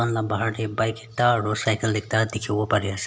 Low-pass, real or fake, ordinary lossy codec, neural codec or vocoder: none; real; none; none